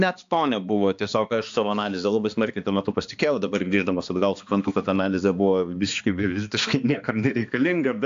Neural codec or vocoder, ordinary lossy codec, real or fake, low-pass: codec, 16 kHz, 2 kbps, X-Codec, HuBERT features, trained on balanced general audio; AAC, 64 kbps; fake; 7.2 kHz